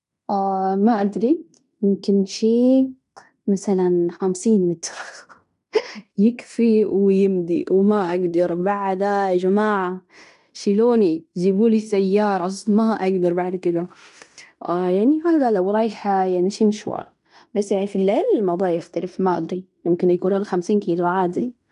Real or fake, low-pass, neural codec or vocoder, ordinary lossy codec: fake; 10.8 kHz; codec, 16 kHz in and 24 kHz out, 0.9 kbps, LongCat-Audio-Codec, fine tuned four codebook decoder; none